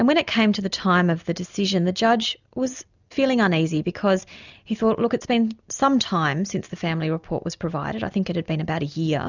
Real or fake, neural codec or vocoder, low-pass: real; none; 7.2 kHz